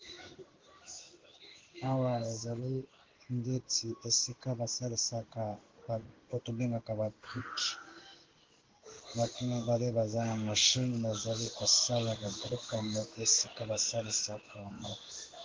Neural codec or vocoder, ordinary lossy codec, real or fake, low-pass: codec, 16 kHz in and 24 kHz out, 1 kbps, XY-Tokenizer; Opus, 16 kbps; fake; 7.2 kHz